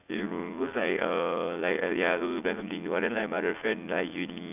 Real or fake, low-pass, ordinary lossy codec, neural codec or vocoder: fake; 3.6 kHz; none; vocoder, 44.1 kHz, 80 mel bands, Vocos